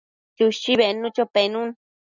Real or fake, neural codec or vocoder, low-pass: real; none; 7.2 kHz